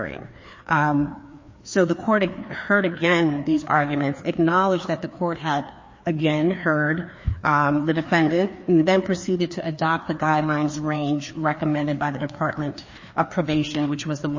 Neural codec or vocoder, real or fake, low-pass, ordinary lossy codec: codec, 16 kHz, 2 kbps, FreqCodec, larger model; fake; 7.2 kHz; MP3, 32 kbps